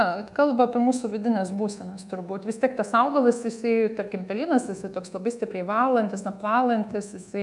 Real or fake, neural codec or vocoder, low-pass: fake; codec, 24 kHz, 1.2 kbps, DualCodec; 10.8 kHz